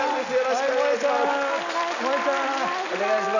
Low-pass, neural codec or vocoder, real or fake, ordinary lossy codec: 7.2 kHz; none; real; none